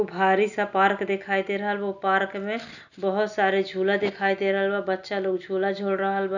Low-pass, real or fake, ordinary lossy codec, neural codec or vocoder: 7.2 kHz; real; none; none